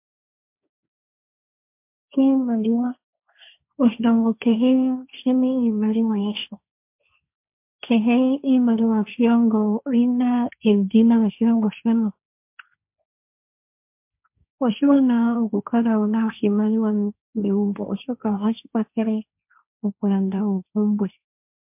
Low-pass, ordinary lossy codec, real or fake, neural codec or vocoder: 3.6 kHz; MP3, 32 kbps; fake; codec, 16 kHz, 1.1 kbps, Voila-Tokenizer